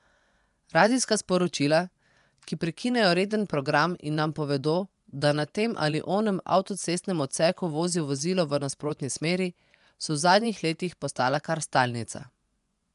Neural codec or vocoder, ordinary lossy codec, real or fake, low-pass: vocoder, 24 kHz, 100 mel bands, Vocos; none; fake; 10.8 kHz